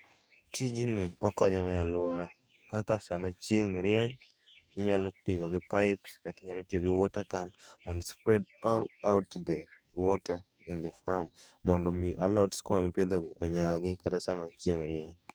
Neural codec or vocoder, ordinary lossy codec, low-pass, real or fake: codec, 44.1 kHz, 2.6 kbps, DAC; none; none; fake